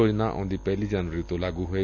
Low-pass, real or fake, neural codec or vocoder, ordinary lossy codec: 7.2 kHz; real; none; none